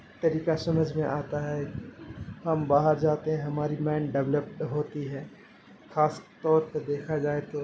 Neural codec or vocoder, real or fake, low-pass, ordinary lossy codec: none; real; none; none